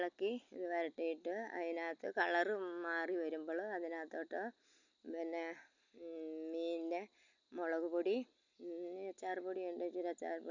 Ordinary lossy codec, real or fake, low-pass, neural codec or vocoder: none; real; 7.2 kHz; none